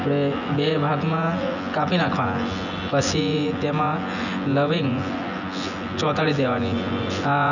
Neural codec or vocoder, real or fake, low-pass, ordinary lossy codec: vocoder, 24 kHz, 100 mel bands, Vocos; fake; 7.2 kHz; none